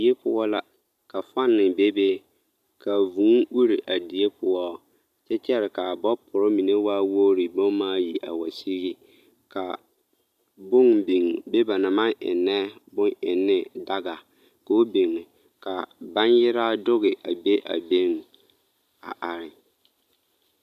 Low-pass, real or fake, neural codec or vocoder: 14.4 kHz; real; none